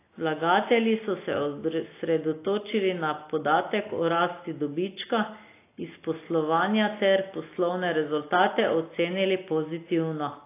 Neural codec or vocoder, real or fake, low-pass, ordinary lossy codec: none; real; 3.6 kHz; AAC, 24 kbps